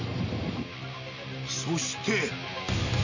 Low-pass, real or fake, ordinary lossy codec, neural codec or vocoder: 7.2 kHz; real; none; none